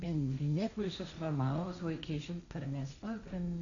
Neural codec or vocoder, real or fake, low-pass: codec, 16 kHz, 1.1 kbps, Voila-Tokenizer; fake; 7.2 kHz